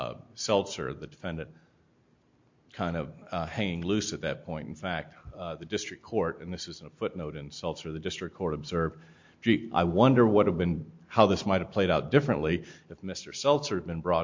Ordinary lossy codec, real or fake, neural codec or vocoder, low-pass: MP3, 48 kbps; real; none; 7.2 kHz